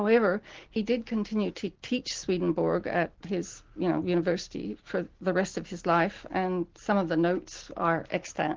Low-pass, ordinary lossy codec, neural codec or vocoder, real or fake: 7.2 kHz; Opus, 16 kbps; none; real